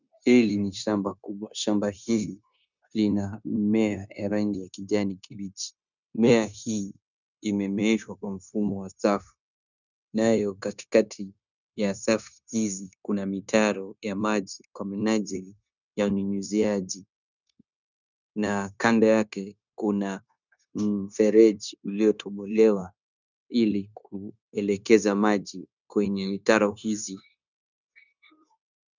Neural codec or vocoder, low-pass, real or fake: codec, 16 kHz, 0.9 kbps, LongCat-Audio-Codec; 7.2 kHz; fake